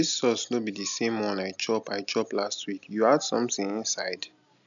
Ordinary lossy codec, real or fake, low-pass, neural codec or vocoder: none; real; 7.2 kHz; none